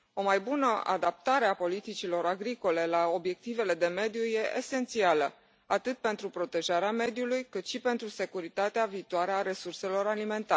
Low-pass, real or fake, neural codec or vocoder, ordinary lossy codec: none; real; none; none